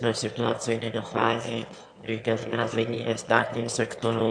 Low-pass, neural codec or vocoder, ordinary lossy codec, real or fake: 9.9 kHz; autoencoder, 22.05 kHz, a latent of 192 numbers a frame, VITS, trained on one speaker; MP3, 64 kbps; fake